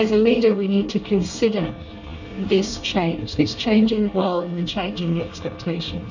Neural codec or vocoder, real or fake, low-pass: codec, 24 kHz, 1 kbps, SNAC; fake; 7.2 kHz